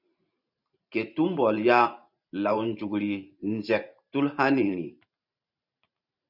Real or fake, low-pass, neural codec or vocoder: fake; 5.4 kHz; vocoder, 44.1 kHz, 128 mel bands every 512 samples, BigVGAN v2